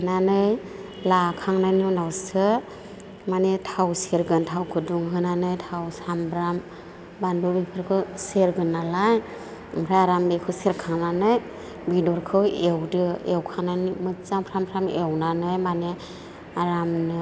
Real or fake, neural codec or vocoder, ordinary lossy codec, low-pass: real; none; none; none